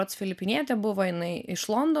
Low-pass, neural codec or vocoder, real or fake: 14.4 kHz; none; real